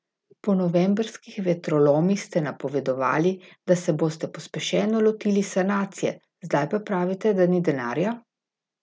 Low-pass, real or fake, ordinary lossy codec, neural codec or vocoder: none; real; none; none